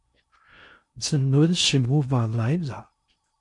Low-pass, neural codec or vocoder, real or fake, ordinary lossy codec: 10.8 kHz; codec, 16 kHz in and 24 kHz out, 0.8 kbps, FocalCodec, streaming, 65536 codes; fake; MP3, 48 kbps